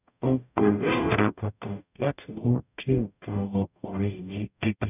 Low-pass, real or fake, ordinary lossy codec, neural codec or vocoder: 3.6 kHz; fake; none; codec, 44.1 kHz, 0.9 kbps, DAC